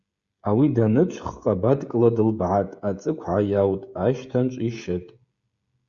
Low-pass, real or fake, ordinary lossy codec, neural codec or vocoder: 7.2 kHz; fake; Opus, 64 kbps; codec, 16 kHz, 16 kbps, FreqCodec, smaller model